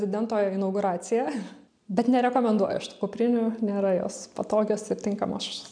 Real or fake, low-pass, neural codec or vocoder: real; 9.9 kHz; none